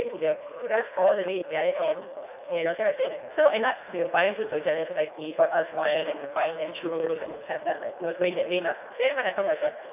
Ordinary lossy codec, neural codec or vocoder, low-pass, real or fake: none; codec, 24 kHz, 1.5 kbps, HILCodec; 3.6 kHz; fake